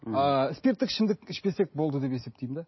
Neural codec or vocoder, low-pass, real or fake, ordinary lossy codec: none; 7.2 kHz; real; MP3, 24 kbps